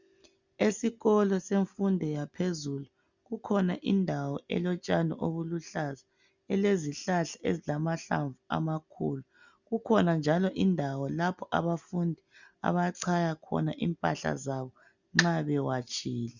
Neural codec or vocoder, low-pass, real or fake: none; 7.2 kHz; real